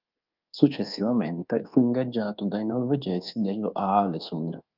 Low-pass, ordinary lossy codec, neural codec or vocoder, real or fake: 5.4 kHz; Opus, 24 kbps; codec, 24 kHz, 1.2 kbps, DualCodec; fake